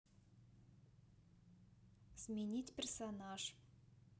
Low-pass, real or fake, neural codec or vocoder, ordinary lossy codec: none; real; none; none